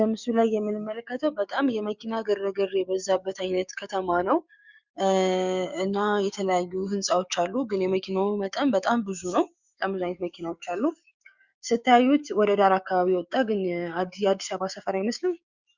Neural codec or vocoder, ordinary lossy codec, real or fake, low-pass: codec, 16 kHz, 6 kbps, DAC; Opus, 64 kbps; fake; 7.2 kHz